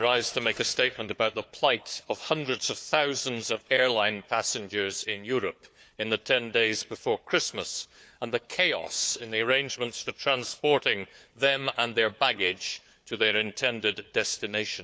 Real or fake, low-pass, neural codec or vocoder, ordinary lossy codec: fake; none; codec, 16 kHz, 4 kbps, FunCodec, trained on Chinese and English, 50 frames a second; none